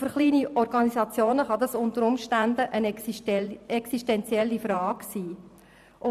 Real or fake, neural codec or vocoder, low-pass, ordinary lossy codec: fake; vocoder, 44.1 kHz, 128 mel bands every 512 samples, BigVGAN v2; 14.4 kHz; none